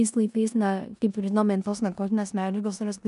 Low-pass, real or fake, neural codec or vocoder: 10.8 kHz; fake; codec, 16 kHz in and 24 kHz out, 0.9 kbps, LongCat-Audio-Codec, four codebook decoder